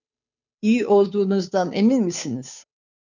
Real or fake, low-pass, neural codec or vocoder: fake; 7.2 kHz; codec, 16 kHz, 2 kbps, FunCodec, trained on Chinese and English, 25 frames a second